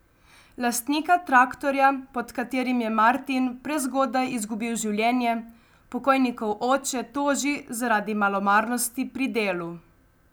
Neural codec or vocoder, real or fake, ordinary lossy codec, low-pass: none; real; none; none